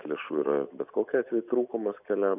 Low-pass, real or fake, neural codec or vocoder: 3.6 kHz; real; none